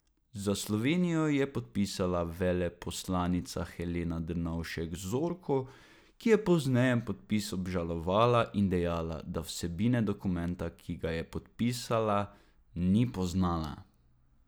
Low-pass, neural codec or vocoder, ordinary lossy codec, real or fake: none; none; none; real